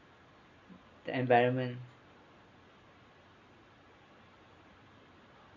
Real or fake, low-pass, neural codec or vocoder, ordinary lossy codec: real; 7.2 kHz; none; none